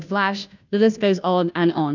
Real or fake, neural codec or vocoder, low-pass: fake; codec, 16 kHz, 0.5 kbps, FunCodec, trained on Chinese and English, 25 frames a second; 7.2 kHz